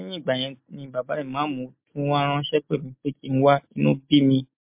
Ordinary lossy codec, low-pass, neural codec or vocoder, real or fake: MP3, 32 kbps; 3.6 kHz; none; real